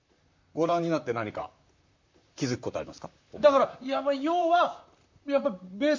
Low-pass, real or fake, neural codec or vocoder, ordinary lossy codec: 7.2 kHz; fake; vocoder, 44.1 kHz, 128 mel bands, Pupu-Vocoder; MP3, 64 kbps